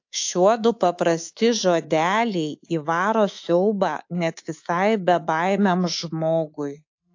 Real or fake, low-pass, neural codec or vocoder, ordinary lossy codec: fake; 7.2 kHz; codec, 24 kHz, 3.1 kbps, DualCodec; AAC, 48 kbps